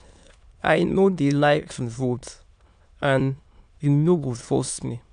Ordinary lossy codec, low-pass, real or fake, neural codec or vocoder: none; 9.9 kHz; fake; autoencoder, 22.05 kHz, a latent of 192 numbers a frame, VITS, trained on many speakers